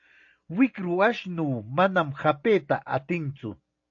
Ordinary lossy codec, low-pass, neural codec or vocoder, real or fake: AAC, 48 kbps; 7.2 kHz; none; real